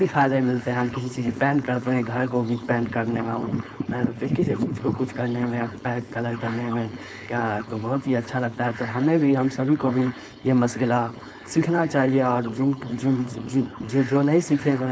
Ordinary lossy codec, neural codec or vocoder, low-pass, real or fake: none; codec, 16 kHz, 4.8 kbps, FACodec; none; fake